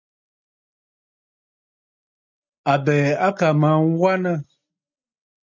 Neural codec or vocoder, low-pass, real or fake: none; 7.2 kHz; real